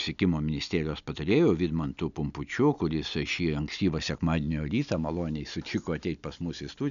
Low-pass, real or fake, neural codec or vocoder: 7.2 kHz; real; none